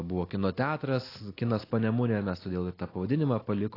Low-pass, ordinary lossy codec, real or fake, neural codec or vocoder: 5.4 kHz; AAC, 24 kbps; real; none